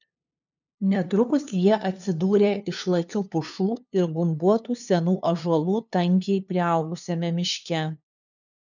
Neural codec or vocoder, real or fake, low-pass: codec, 16 kHz, 2 kbps, FunCodec, trained on LibriTTS, 25 frames a second; fake; 7.2 kHz